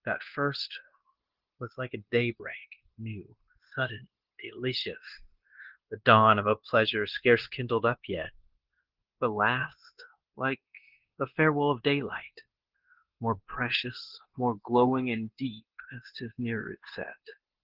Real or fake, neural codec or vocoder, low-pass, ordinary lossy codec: fake; codec, 16 kHz, 0.9 kbps, LongCat-Audio-Codec; 5.4 kHz; Opus, 16 kbps